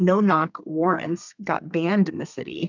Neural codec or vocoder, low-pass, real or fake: codec, 32 kHz, 1.9 kbps, SNAC; 7.2 kHz; fake